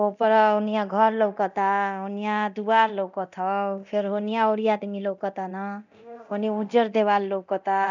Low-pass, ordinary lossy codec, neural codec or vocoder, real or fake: 7.2 kHz; none; codec, 24 kHz, 0.9 kbps, DualCodec; fake